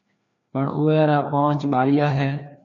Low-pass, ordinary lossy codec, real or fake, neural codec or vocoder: 7.2 kHz; AAC, 48 kbps; fake; codec, 16 kHz, 2 kbps, FreqCodec, larger model